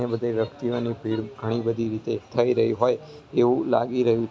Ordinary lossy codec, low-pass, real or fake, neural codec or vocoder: none; none; real; none